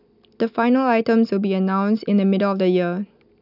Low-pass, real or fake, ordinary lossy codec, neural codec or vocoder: 5.4 kHz; real; none; none